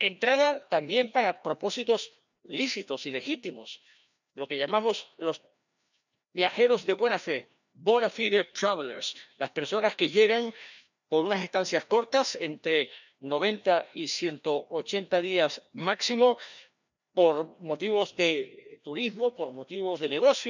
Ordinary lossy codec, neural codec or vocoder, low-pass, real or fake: none; codec, 16 kHz, 1 kbps, FreqCodec, larger model; 7.2 kHz; fake